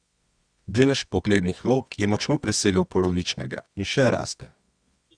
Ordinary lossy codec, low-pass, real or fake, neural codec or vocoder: Opus, 64 kbps; 9.9 kHz; fake; codec, 24 kHz, 0.9 kbps, WavTokenizer, medium music audio release